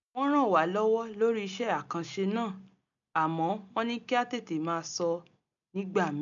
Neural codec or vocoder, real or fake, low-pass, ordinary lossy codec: none; real; 7.2 kHz; none